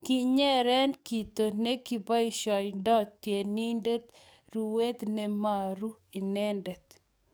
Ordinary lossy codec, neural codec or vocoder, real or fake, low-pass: none; codec, 44.1 kHz, 7.8 kbps, DAC; fake; none